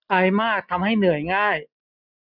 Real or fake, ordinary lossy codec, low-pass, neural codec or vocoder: real; none; 5.4 kHz; none